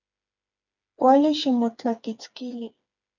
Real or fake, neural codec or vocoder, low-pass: fake; codec, 16 kHz, 4 kbps, FreqCodec, smaller model; 7.2 kHz